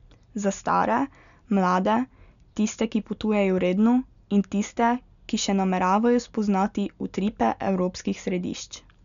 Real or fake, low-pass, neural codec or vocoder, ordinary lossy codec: real; 7.2 kHz; none; none